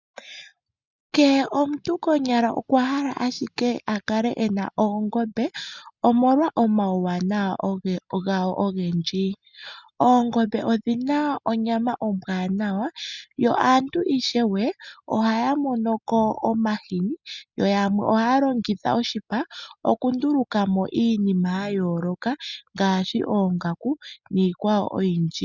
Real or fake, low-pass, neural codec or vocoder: real; 7.2 kHz; none